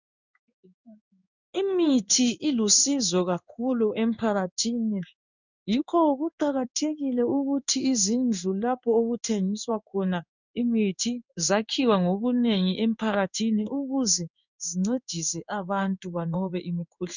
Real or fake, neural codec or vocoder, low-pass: fake; codec, 16 kHz in and 24 kHz out, 1 kbps, XY-Tokenizer; 7.2 kHz